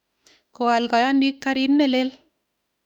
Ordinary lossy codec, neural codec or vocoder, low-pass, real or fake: none; autoencoder, 48 kHz, 32 numbers a frame, DAC-VAE, trained on Japanese speech; 19.8 kHz; fake